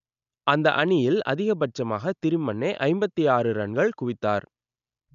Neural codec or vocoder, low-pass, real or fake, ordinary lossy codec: none; 7.2 kHz; real; none